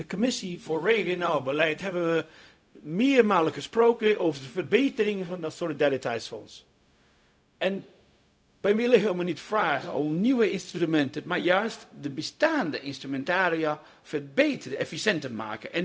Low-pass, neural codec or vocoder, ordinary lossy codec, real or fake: none; codec, 16 kHz, 0.4 kbps, LongCat-Audio-Codec; none; fake